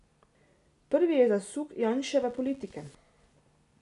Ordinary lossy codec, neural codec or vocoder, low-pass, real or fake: none; none; 10.8 kHz; real